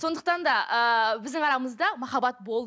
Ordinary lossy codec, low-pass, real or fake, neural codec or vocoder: none; none; real; none